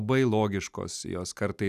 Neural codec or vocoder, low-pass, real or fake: none; 14.4 kHz; real